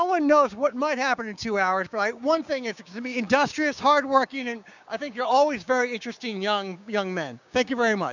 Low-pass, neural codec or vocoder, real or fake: 7.2 kHz; codec, 16 kHz, 6 kbps, DAC; fake